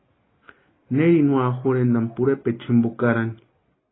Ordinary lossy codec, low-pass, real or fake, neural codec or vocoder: AAC, 16 kbps; 7.2 kHz; real; none